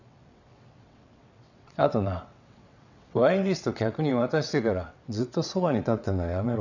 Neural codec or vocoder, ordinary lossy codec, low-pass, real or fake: vocoder, 22.05 kHz, 80 mel bands, WaveNeXt; none; 7.2 kHz; fake